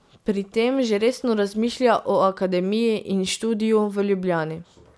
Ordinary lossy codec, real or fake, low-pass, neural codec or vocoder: none; real; none; none